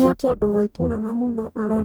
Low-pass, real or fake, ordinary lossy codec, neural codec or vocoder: none; fake; none; codec, 44.1 kHz, 0.9 kbps, DAC